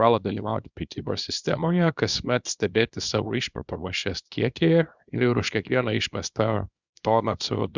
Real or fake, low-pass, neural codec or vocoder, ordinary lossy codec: fake; 7.2 kHz; codec, 24 kHz, 0.9 kbps, WavTokenizer, small release; Opus, 64 kbps